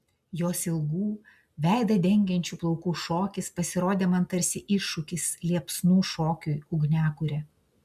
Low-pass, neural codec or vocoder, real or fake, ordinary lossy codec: 14.4 kHz; none; real; MP3, 96 kbps